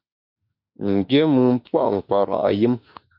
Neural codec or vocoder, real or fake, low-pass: autoencoder, 48 kHz, 32 numbers a frame, DAC-VAE, trained on Japanese speech; fake; 5.4 kHz